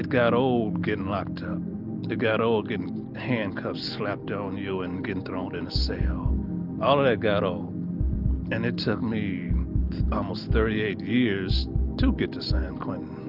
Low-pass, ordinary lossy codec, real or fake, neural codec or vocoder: 5.4 kHz; Opus, 24 kbps; real; none